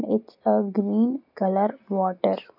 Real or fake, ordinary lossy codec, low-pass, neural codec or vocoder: real; none; 5.4 kHz; none